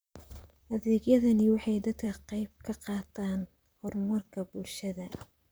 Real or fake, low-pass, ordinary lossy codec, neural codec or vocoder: fake; none; none; vocoder, 44.1 kHz, 128 mel bands, Pupu-Vocoder